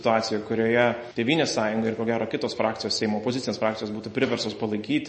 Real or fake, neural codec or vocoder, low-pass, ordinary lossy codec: real; none; 10.8 kHz; MP3, 32 kbps